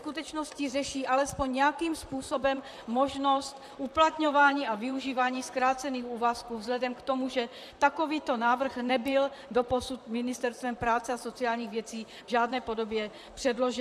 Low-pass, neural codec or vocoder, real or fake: 14.4 kHz; vocoder, 44.1 kHz, 128 mel bands, Pupu-Vocoder; fake